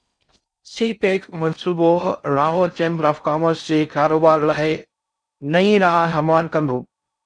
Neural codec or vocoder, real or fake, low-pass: codec, 16 kHz in and 24 kHz out, 0.6 kbps, FocalCodec, streaming, 4096 codes; fake; 9.9 kHz